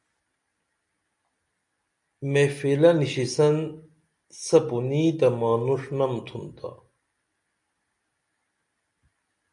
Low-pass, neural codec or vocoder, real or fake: 10.8 kHz; none; real